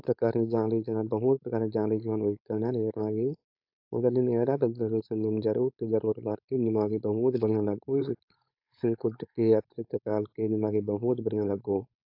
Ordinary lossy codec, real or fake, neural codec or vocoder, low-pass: AAC, 48 kbps; fake; codec, 16 kHz, 4.8 kbps, FACodec; 5.4 kHz